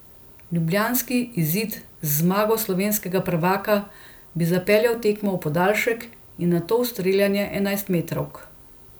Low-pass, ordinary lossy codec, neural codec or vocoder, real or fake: none; none; none; real